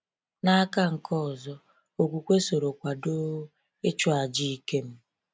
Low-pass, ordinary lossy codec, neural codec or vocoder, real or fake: none; none; none; real